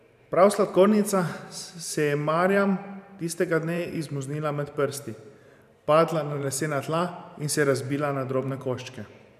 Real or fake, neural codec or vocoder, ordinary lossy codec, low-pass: fake; vocoder, 44.1 kHz, 128 mel bands every 512 samples, BigVGAN v2; none; 14.4 kHz